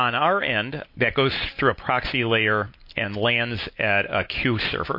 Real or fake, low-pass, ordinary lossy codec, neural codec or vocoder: real; 5.4 kHz; MP3, 32 kbps; none